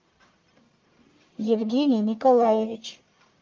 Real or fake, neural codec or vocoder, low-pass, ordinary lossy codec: fake; codec, 44.1 kHz, 1.7 kbps, Pupu-Codec; 7.2 kHz; Opus, 32 kbps